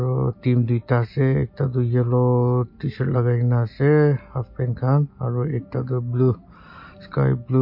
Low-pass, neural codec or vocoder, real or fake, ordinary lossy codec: 5.4 kHz; none; real; MP3, 32 kbps